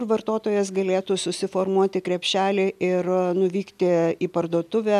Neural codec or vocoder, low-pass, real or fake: none; 14.4 kHz; real